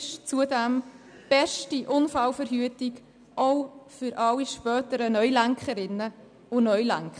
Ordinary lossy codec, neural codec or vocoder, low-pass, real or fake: none; none; 9.9 kHz; real